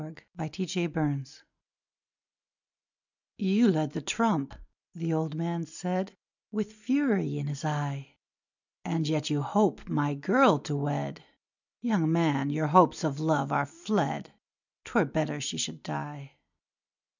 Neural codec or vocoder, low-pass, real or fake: none; 7.2 kHz; real